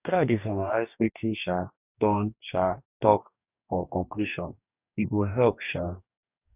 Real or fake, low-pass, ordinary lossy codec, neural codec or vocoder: fake; 3.6 kHz; none; codec, 44.1 kHz, 2.6 kbps, DAC